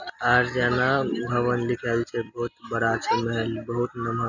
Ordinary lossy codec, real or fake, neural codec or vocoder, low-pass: none; real; none; 7.2 kHz